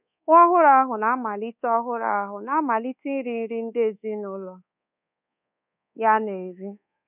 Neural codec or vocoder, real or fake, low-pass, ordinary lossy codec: codec, 24 kHz, 1.2 kbps, DualCodec; fake; 3.6 kHz; none